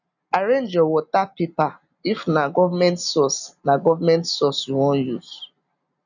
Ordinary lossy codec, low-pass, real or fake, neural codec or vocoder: none; 7.2 kHz; real; none